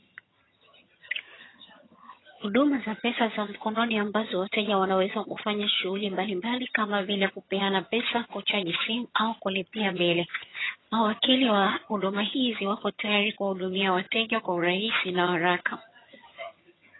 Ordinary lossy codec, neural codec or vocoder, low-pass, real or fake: AAC, 16 kbps; vocoder, 22.05 kHz, 80 mel bands, HiFi-GAN; 7.2 kHz; fake